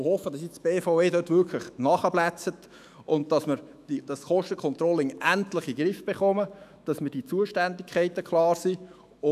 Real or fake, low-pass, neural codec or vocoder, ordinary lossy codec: fake; 14.4 kHz; autoencoder, 48 kHz, 128 numbers a frame, DAC-VAE, trained on Japanese speech; none